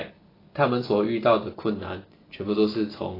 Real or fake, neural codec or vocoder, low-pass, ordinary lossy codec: real; none; 5.4 kHz; AAC, 24 kbps